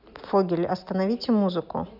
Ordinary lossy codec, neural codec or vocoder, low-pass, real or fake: none; none; 5.4 kHz; real